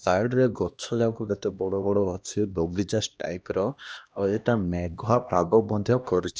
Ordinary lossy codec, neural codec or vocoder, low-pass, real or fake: none; codec, 16 kHz, 1 kbps, X-Codec, HuBERT features, trained on LibriSpeech; none; fake